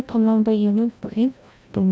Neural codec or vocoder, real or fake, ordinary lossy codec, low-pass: codec, 16 kHz, 0.5 kbps, FreqCodec, larger model; fake; none; none